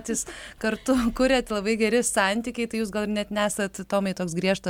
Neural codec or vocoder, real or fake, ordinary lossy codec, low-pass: none; real; MP3, 96 kbps; 19.8 kHz